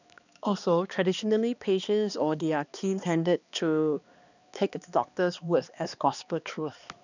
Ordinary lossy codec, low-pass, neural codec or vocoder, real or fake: none; 7.2 kHz; codec, 16 kHz, 2 kbps, X-Codec, HuBERT features, trained on balanced general audio; fake